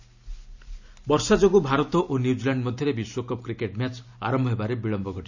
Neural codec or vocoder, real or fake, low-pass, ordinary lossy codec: none; real; 7.2 kHz; none